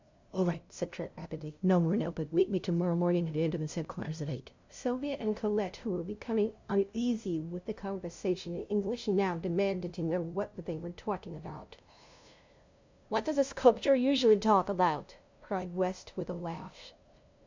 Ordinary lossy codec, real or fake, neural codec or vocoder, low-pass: MP3, 64 kbps; fake; codec, 16 kHz, 0.5 kbps, FunCodec, trained on LibriTTS, 25 frames a second; 7.2 kHz